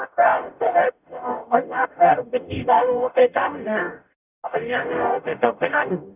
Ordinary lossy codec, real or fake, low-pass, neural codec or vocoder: none; fake; 3.6 kHz; codec, 44.1 kHz, 0.9 kbps, DAC